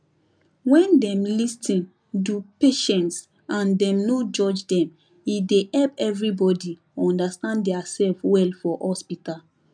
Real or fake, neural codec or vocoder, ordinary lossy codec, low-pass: real; none; none; 9.9 kHz